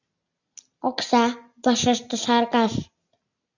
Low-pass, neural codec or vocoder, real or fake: 7.2 kHz; none; real